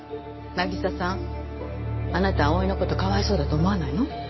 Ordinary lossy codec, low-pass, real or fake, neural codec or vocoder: MP3, 24 kbps; 7.2 kHz; real; none